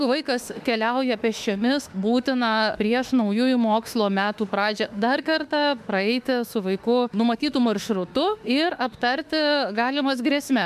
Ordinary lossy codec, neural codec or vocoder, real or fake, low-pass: MP3, 96 kbps; autoencoder, 48 kHz, 32 numbers a frame, DAC-VAE, trained on Japanese speech; fake; 14.4 kHz